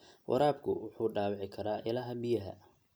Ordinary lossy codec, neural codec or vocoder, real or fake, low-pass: none; none; real; none